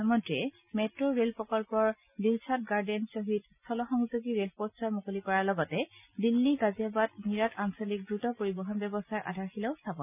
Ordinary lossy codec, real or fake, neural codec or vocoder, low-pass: none; real; none; 3.6 kHz